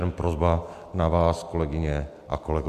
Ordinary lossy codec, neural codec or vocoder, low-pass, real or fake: AAC, 96 kbps; none; 14.4 kHz; real